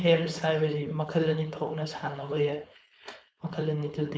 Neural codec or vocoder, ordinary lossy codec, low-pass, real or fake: codec, 16 kHz, 4.8 kbps, FACodec; none; none; fake